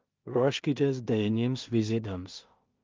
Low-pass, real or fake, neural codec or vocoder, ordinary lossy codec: 7.2 kHz; fake; codec, 16 kHz in and 24 kHz out, 0.4 kbps, LongCat-Audio-Codec, two codebook decoder; Opus, 32 kbps